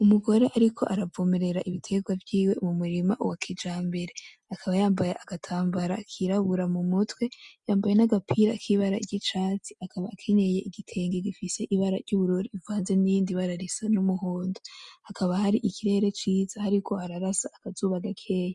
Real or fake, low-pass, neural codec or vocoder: real; 10.8 kHz; none